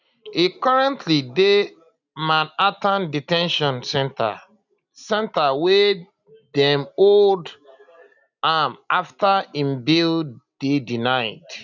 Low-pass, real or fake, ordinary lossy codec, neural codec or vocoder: 7.2 kHz; real; none; none